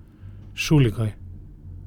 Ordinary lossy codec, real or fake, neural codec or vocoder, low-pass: none; real; none; 19.8 kHz